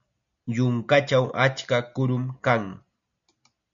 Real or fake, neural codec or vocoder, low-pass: real; none; 7.2 kHz